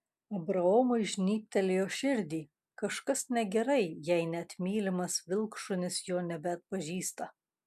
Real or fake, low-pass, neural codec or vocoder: real; 14.4 kHz; none